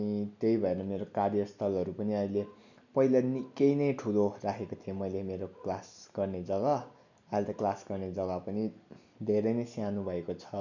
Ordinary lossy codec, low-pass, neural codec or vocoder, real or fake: none; 7.2 kHz; none; real